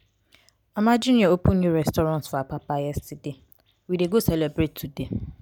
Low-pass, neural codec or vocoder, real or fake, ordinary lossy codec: 19.8 kHz; none; real; none